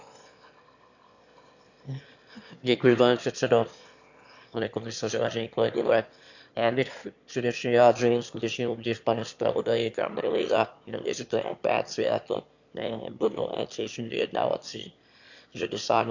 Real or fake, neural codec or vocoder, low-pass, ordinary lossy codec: fake; autoencoder, 22.05 kHz, a latent of 192 numbers a frame, VITS, trained on one speaker; 7.2 kHz; none